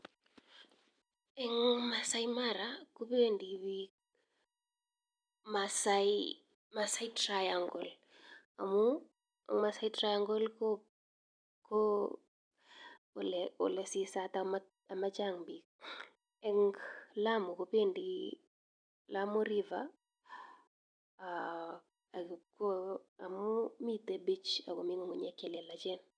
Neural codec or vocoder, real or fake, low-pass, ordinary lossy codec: none; real; 9.9 kHz; none